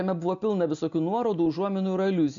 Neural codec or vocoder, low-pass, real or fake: none; 7.2 kHz; real